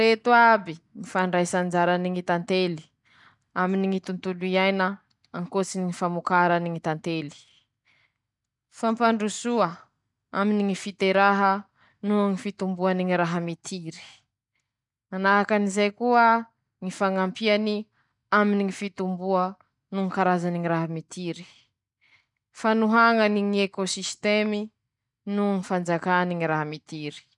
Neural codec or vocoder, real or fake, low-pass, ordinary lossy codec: none; real; 10.8 kHz; none